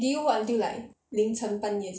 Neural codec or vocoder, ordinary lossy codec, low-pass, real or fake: none; none; none; real